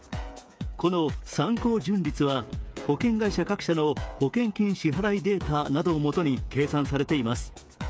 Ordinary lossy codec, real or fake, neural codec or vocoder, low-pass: none; fake; codec, 16 kHz, 8 kbps, FreqCodec, smaller model; none